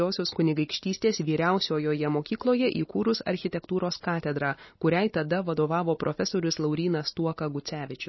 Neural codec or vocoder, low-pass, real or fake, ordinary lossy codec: none; 7.2 kHz; real; MP3, 24 kbps